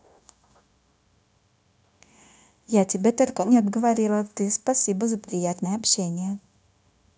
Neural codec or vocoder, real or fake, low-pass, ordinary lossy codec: codec, 16 kHz, 0.9 kbps, LongCat-Audio-Codec; fake; none; none